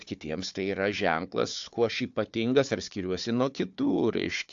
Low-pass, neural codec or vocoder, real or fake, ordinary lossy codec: 7.2 kHz; codec, 16 kHz, 4 kbps, FunCodec, trained on Chinese and English, 50 frames a second; fake; AAC, 64 kbps